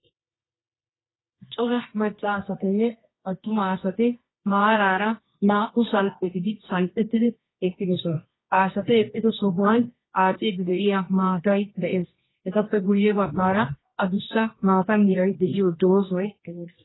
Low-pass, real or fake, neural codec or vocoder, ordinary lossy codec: 7.2 kHz; fake; codec, 24 kHz, 0.9 kbps, WavTokenizer, medium music audio release; AAC, 16 kbps